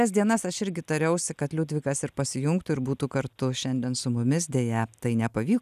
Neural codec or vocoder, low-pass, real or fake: none; 14.4 kHz; real